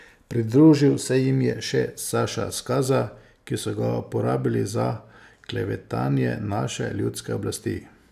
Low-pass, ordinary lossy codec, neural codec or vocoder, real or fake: 14.4 kHz; AAC, 96 kbps; none; real